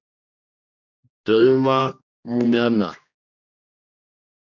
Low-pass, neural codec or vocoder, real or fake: 7.2 kHz; codec, 16 kHz, 1 kbps, X-Codec, HuBERT features, trained on general audio; fake